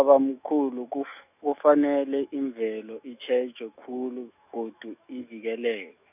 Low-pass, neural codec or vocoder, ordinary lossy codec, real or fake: 3.6 kHz; autoencoder, 48 kHz, 128 numbers a frame, DAC-VAE, trained on Japanese speech; none; fake